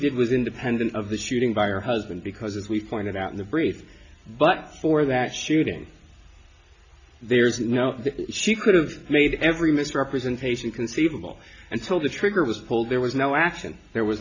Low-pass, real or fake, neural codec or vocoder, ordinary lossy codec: 7.2 kHz; real; none; AAC, 48 kbps